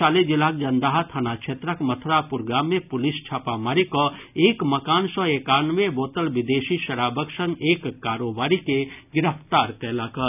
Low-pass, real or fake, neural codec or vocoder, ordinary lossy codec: 3.6 kHz; real; none; none